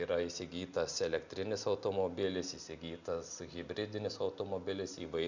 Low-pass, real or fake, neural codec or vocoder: 7.2 kHz; real; none